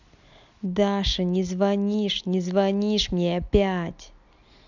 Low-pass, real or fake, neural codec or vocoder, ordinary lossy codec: 7.2 kHz; real; none; none